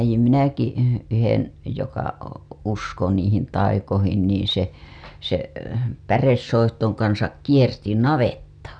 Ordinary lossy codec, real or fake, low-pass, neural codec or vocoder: none; real; 9.9 kHz; none